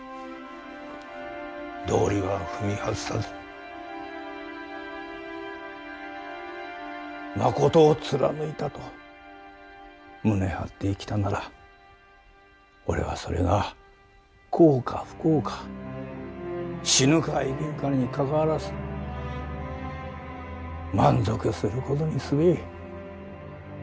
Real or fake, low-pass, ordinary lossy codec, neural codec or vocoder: real; none; none; none